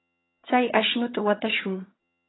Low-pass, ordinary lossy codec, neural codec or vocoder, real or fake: 7.2 kHz; AAC, 16 kbps; vocoder, 22.05 kHz, 80 mel bands, HiFi-GAN; fake